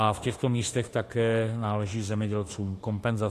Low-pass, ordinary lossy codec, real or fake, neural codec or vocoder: 14.4 kHz; AAC, 48 kbps; fake; autoencoder, 48 kHz, 32 numbers a frame, DAC-VAE, trained on Japanese speech